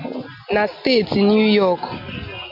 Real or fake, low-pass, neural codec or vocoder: real; 5.4 kHz; none